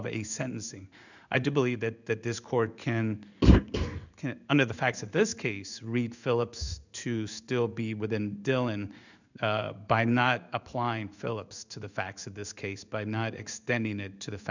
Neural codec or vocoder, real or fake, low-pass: codec, 16 kHz in and 24 kHz out, 1 kbps, XY-Tokenizer; fake; 7.2 kHz